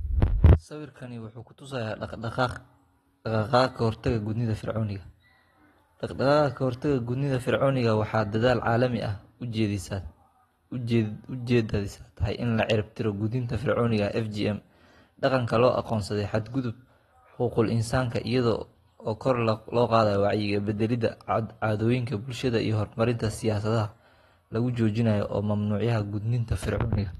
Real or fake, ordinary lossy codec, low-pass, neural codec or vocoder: real; AAC, 32 kbps; 19.8 kHz; none